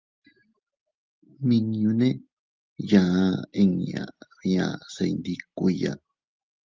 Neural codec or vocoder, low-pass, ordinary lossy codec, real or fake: none; 7.2 kHz; Opus, 24 kbps; real